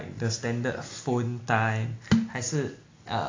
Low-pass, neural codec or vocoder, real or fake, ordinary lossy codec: 7.2 kHz; none; real; AAC, 32 kbps